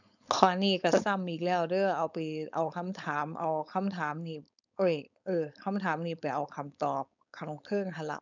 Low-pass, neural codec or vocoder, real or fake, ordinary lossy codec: 7.2 kHz; codec, 16 kHz, 4.8 kbps, FACodec; fake; none